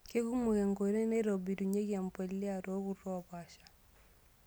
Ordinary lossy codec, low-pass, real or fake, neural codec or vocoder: none; none; real; none